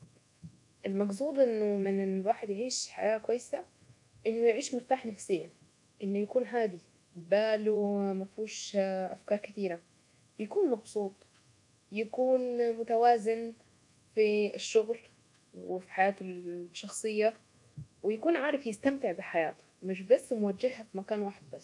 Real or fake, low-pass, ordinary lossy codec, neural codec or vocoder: fake; 10.8 kHz; none; codec, 24 kHz, 1.2 kbps, DualCodec